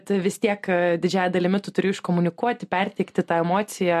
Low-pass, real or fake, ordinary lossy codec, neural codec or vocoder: 14.4 kHz; real; MP3, 64 kbps; none